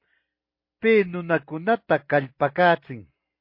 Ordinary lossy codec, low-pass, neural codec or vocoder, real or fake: MP3, 32 kbps; 5.4 kHz; none; real